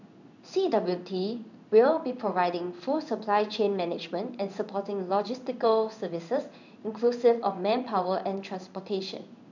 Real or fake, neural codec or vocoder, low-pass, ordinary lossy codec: fake; codec, 16 kHz in and 24 kHz out, 1 kbps, XY-Tokenizer; 7.2 kHz; none